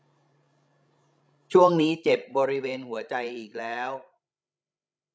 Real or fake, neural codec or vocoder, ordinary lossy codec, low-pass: fake; codec, 16 kHz, 16 kbps, FreqCodec, larger model; none; none